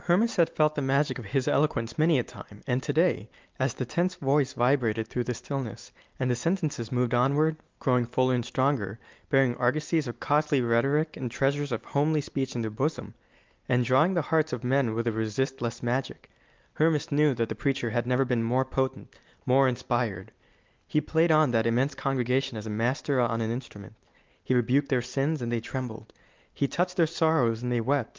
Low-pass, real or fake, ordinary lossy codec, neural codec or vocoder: 7.2 kHz; real; Opus, 32 kbps; none